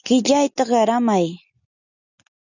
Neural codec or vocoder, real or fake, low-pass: none; real; 7.2 kHz